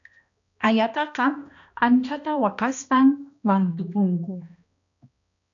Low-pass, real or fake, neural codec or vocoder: 7.2 kHz; fake; codec, 16 kHz, 1 kbps, X-Codec, HuBERT features, trained on balanced general audio